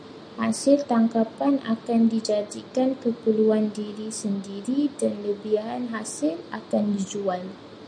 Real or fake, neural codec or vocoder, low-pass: real; none; 9.9 kHz